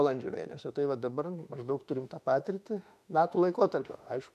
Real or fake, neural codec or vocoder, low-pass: fake; autoencoder, 48 kHz, 32 numbers a frame, DAC-VAE, trained on Japanese speech; 14.4 kHz